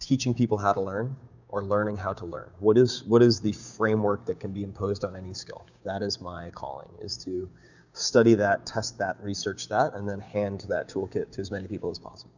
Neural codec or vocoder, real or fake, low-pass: codec, 16 kHz, 6 kbps, DAC; fake; 7.2 kHz